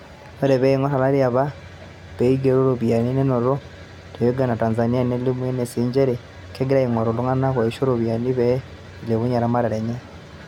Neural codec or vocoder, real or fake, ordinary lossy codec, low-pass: none; real; none; 19.8 kHz